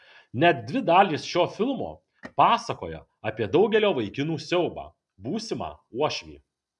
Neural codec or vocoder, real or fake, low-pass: none; real; 10.8 kHz